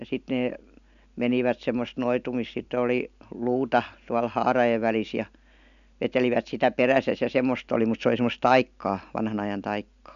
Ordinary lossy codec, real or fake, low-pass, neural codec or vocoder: none; real; 7.2 kHz; none